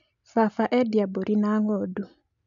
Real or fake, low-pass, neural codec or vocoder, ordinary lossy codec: real; 7.2 kHz; none; MP3, 96 kbps